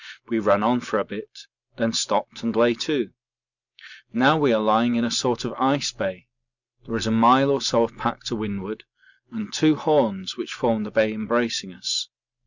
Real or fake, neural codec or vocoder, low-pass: real; none; 7.2 kHz